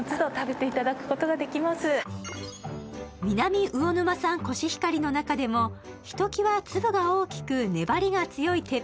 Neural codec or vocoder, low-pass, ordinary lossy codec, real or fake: none; none; none; real